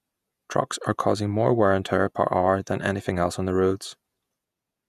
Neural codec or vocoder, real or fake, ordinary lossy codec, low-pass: vocoder, 48 kHz, 128 mel bands, Vocos; fake; none; 14.4 kHz